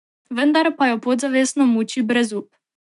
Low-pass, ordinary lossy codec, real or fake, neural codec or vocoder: 10.8 kHz; none; real; none